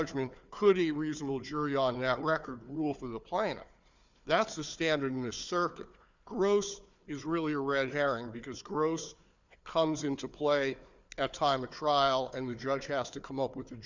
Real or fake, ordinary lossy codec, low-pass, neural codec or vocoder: fake; Opus, 64 kbps; 7.2 kHz; codec, 16 kHz, 4 kbps, FunCodec, trained on Chinese and English, 50 frames a second